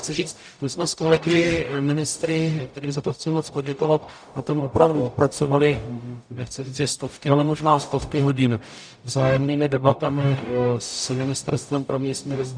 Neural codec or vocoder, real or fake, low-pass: codec, 44.1 kHz, 0.9 kbps, DAC; fake; 9.9 kHz